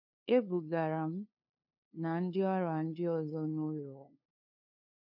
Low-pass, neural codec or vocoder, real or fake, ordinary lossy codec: 5.4 kHz; codec, 16 kHz, 2 kbps, FunCodec, trained on LibriTTS, 25 frames a second; fake; none